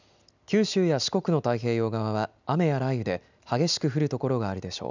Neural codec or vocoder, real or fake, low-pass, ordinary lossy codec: none; real; 7.2 kHz; none